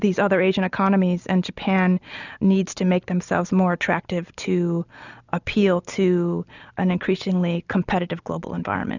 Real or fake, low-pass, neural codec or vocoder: real; 7.2 kHz; none